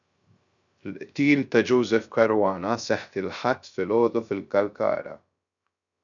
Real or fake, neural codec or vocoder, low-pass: fake; codec, 16 kHz, 0.3 kbps, FocalCodec; 7.2 kHz